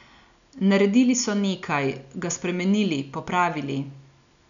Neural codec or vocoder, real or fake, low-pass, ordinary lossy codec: none; real; 7.2 kHz; none